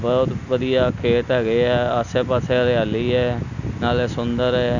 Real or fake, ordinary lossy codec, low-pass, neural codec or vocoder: real; none; 7.2 kHz; none